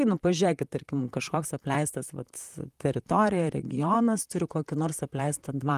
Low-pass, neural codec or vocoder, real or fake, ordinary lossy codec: 14.4 kHz; vocoder, 44.1 kHz, 128 mel bands, Pupu-Vocoder; fake; Opus, 32 kbps